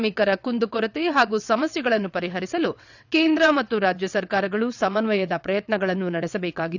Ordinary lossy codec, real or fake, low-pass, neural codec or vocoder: none; fake; 7.2 kHz; vocoder, 22.05 kHz, 80 mel bands, WaveNeXt